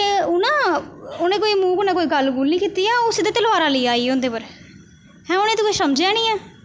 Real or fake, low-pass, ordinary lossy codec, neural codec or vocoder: real; none; none; none